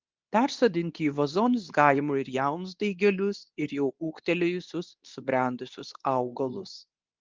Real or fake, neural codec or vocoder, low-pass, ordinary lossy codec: fake; codec, 24 kHz, 0.9 kbps, WavTokenizer, medium speech release version 2; 7.2 kHz; Opus, 24 kbps